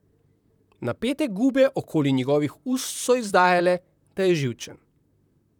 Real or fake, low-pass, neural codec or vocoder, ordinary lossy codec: fake; 19.8 kHz; vocoder, 44.1 kHz, 128 mel bands, Pupu-Vocoder; none